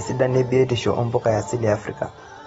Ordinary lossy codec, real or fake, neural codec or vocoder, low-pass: AAC, 24 kbps; real; none; 19.8 kHz